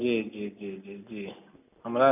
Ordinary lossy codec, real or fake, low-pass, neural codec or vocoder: none; real; 3.6 kHz; none